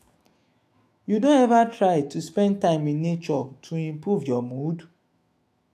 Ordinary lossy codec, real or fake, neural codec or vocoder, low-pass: AAC, 64 kbps; fake; autoencoder, 48 kHz, 128 numbers a frame, DAC-VAE, trained on Japanese speech; 14.4 kHz